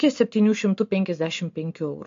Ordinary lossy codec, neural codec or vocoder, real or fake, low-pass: MP3, 48 kbps; none; real; 7.2 kHz